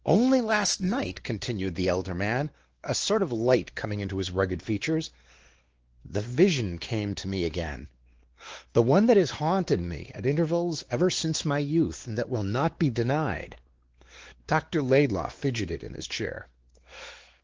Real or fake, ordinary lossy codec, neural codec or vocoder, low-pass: fake; Opus, 16 kbps; codec, 16 kHz, 2 kbps, X-Codec, WavLM features, trained on Multilingual LibriSpeech; 7.2 kHz